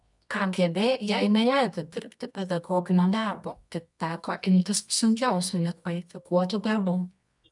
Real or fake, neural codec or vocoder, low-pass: fake; codec, 24 kHz, 0.9 kbps, WavTokenizer, medium music audio release; 10.8 kHz